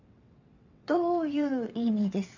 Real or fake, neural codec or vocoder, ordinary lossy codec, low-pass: fake; vocoder, 22.05 kHz, 80 mel bands, WaveNeXt; none; 7.2 kHz